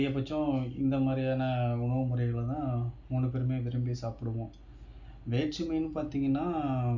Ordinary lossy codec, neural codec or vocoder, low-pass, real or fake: none; none; 7.2 kHz; real